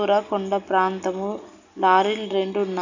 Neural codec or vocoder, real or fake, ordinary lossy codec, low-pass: none; real; none; 7.2 kHz